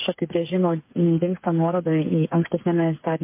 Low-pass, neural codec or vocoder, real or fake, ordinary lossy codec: 3.6 kHz; codec, 16 kHz, 8 kbps, FreqCodec, smaller model; fake; MP3, 24 kbps